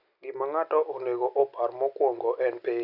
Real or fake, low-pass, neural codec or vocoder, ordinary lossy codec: real; 5.4 kHz; none; none